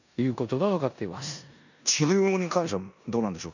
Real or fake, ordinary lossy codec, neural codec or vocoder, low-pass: fake; none; codec, 16 kHz in and 24 kHz out, 0.9 kbps, LongCat-Audio-Codec, four codebook decoder; 7.2 kHz